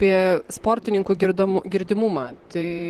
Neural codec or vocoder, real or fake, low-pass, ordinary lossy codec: vocoder, 44.1 kHz, 128 mel bands, Pupu-Vocoder; fake; 14.4 kHz; Opus, 16 kbps